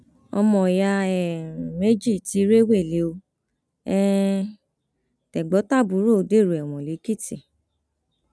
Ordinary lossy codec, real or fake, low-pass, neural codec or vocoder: none; real; none; none